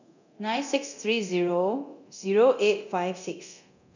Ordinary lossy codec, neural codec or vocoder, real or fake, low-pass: none; codec, 24 kHz, 0.9 kbps, DualCodec; fake; 7.2 kHz